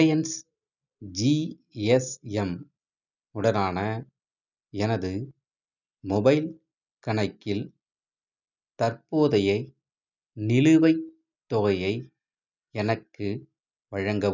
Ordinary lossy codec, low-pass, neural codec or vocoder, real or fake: none; 7.2 kHz; none; real